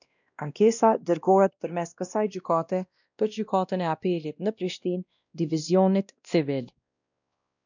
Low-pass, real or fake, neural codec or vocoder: 7.2 kHz; fake; codec, 16 kHz, 1 kbps, X-Codec, WavLM features, trained on Multilingual LibriSpeech